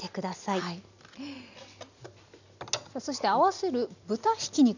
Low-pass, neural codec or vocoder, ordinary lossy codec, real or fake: 7.2 kHz; none; none; real